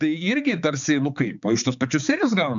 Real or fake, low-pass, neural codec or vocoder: fake; 7.2 kHz; codec, 16 kHz, 4 kbps, X-Codec, HuBERT features, trained on balanced general audio